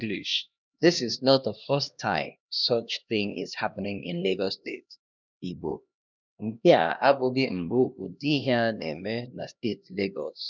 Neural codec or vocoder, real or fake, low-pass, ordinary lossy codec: codec, 16 kHz, 1 kbps, X-Codec, HuBERT features, trained on LibriSpeech; fake; 7.2 kHz; none